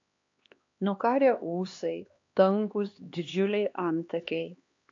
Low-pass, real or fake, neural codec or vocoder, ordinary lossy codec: 7.2 kHz; fake; codec, 16 kHz, 2 kbps, X-Codec, HuBERT features, trained on LibriSpeech; AAC, 48 kbps